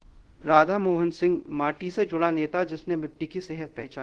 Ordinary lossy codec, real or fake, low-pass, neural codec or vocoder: Opus, 16 kbps; fake; 10.8 kHz; codec, 24 kHz, 0.5 kbps, DualCodec